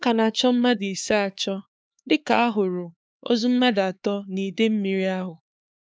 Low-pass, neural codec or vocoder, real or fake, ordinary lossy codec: none; codec, 16 kHz, 4 kbps, X-Codec, HuBERT features, trained on LibriSpeech; fake; none